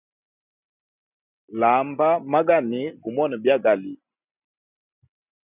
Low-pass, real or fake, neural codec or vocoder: 3.6 kHz; real; none